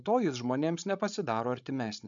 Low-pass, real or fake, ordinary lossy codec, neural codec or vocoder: 7.2 kHz; fake; MP3, 64 kbps; codec, 16 kHz, 16 kbps, FreqCodec, larger model